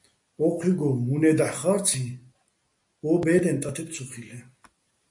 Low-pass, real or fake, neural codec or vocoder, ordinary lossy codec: 10.8 kHz; real; none; MP3, 48 kbps